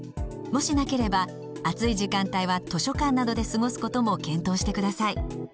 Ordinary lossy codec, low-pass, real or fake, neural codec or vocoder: none; none; real; none